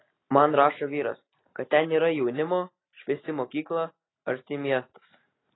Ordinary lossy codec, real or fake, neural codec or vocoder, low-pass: AAC, 16 kbps; real; none; 7.2 kHz